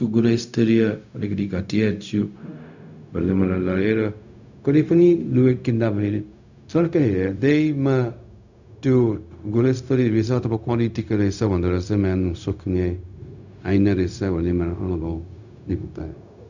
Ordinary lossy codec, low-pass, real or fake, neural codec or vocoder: none; 7.2 kHz; fake; codec, 16 kHz, 0.4 kbps, LongCat-Audio-Codec